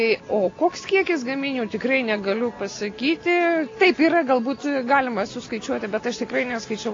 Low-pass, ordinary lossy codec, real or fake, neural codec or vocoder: 7.2 kHz; AAC, 32 kbps; real; none